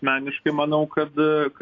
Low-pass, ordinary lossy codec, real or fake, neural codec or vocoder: 7.2 kHz; AAC, 48 kbps; real; none